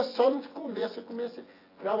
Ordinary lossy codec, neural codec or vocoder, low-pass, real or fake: AAC, 24 kbps; vocoder, 24 kHz, 100 mel bands, Vocos; 5.4 kHz; fake